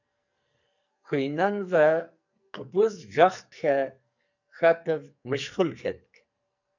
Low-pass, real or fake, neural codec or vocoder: 7.2 kHz; fake; codec, 44.1 kHz, 2.6 kbps, SNAC